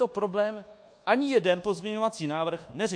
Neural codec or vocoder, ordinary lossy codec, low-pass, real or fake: codec, 24 kHz, 1.2 kbps, DualCodec; MP3, 48 kbps; 9.9 kHz; fake